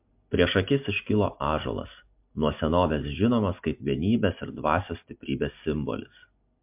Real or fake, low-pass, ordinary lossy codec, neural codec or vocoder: real; 3.6 kHz; MP3, 32 kbps; none